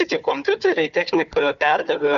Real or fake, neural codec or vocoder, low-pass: fake; codec, 16 kHz, 4 kbps, FunCodec, trained on Chinese and English, 50 frames a second; 7.2 kHz